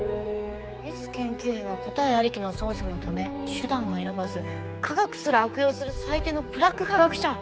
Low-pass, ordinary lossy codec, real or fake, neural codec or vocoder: none; none; fake; codec, 16 kHz, 4 kbps, X-Codec, HuBERT features, trained on general audio